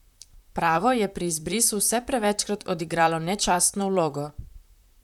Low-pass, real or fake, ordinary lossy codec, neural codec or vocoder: 19.8 kHz; fake; none; vocoder, 44.1 kHz, 128 mel bands, Pupu-Vocoder